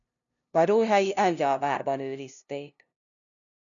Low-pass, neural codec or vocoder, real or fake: 7.2 kHz; codec, 16 kHz, 0.5 kbps, FunCodec, trained on LibriTTS, 25 frames a second; fake